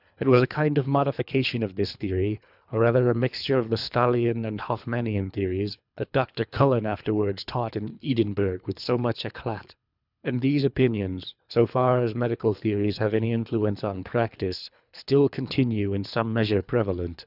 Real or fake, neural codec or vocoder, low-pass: fake; codec, 24 kHz, 3 kbps, HILCodec; 5.4 kHz